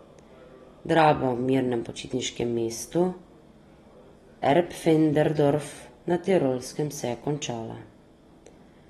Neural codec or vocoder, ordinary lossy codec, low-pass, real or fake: none; AAC, 32 kbps; 14.4 kHz; real